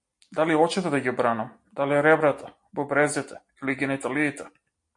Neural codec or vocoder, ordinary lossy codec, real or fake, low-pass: none; MP3, 48 kbps; real; 10.8 kHz